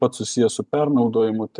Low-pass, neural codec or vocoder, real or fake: 10.8 kHz; none; real